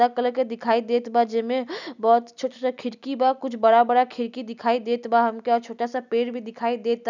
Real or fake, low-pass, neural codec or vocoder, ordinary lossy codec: real; 7.2 kHz; none; none